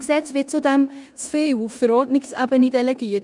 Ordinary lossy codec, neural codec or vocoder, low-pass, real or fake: none; codec, 16 kHz in and 24 kHz out, 0.9 kbps, LongCat-Audio-Codec, four codebook decoder; 10.8 kHz; fake